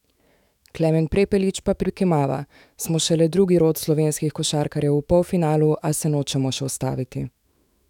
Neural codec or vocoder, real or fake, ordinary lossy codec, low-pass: autoencoder, 48 kHz, 128 numbers a frame, DAC-VAE, trained on Japanese speech; fake; none; 19.8 kHz